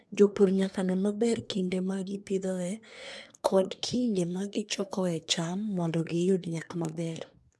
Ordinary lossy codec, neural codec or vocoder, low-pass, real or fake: none; codec, 24 kHz, 1 kbps, SNAC; none; fake